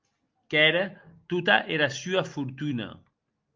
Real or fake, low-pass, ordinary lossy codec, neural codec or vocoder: real; 7.2 kHz; Opus, 24 kbps; none